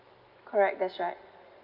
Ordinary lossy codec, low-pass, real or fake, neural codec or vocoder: Opus, 24 kbps; 5.4 kHz; real; none